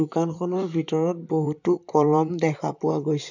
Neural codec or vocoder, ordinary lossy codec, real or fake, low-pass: vocoder, 44.1 kHz, 128 mel bands, Pupu-Vocoder; none; fake; 7.2 kHz